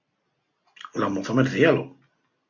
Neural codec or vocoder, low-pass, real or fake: none; 7.2 kHz; real